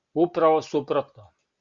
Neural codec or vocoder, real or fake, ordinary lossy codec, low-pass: none; real; Opus, 64 kbps; 7.2 kHz